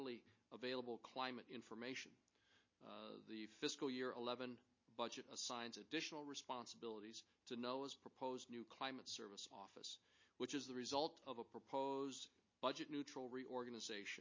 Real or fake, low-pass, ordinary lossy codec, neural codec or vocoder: real; 7.2 kHz; MP3, 32 kbps; none